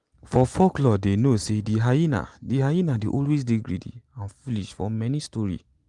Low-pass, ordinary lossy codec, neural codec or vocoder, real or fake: 10.8 kHz; Opus, 24 kbps; none; real